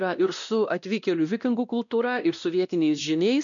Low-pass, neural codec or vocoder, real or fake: 7.2 kHz; codec, 16 kHz, 1 kbps, X-Codec, WavLM features, trained on Multilingual LibriSpeech; fake